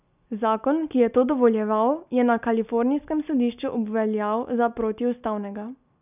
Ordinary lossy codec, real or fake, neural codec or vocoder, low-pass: none; real; none; 3.6 kHz